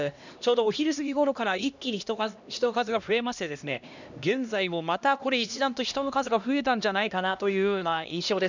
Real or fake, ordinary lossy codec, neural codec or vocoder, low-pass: fake; none; codec, 16 kHz, 1 kbps, X-Codec, HuBERT features, trained on LibriSpeech; 7.2 kHz